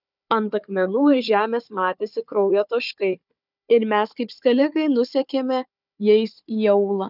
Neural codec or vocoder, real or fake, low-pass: codec, 16 kHz, 4 kbps, FunCodec, trained on Chinese and English, 50 frames a second; fake; 5.4 kHz